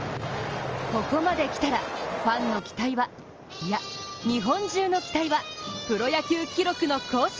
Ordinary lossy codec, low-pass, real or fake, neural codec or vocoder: Opus, 24 kbps; 7.2 kHz; real; none